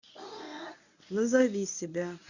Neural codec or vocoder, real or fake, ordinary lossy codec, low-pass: codec, 24 kHz, 0.9 kbps, WavTokenizer, medium speech release version 1; fake; none; 7.2 kHz